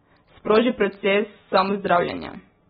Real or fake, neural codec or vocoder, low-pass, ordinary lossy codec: real; none; 9.9 kHz; AAC, 16 kbps